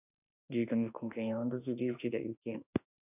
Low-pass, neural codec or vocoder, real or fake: 3.6 kHz; autoencoder, 48 kHz, 32 numbers a frame, DAC-VAE, trained on Japanese speech; fake